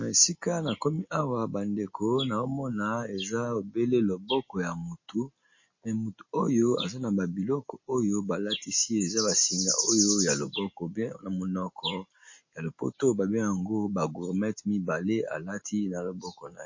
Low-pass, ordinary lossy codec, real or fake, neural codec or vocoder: 7.2 kHz; MP3, 32 kbps; real; none